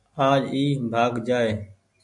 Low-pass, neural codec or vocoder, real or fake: 10.8 kHz; none; real